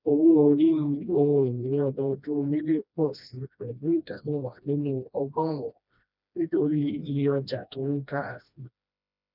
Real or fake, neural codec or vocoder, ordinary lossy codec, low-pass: fake; codec, 16 kHz, 1 kbps, FreqCodec, smaller model; none; 5.4 kHz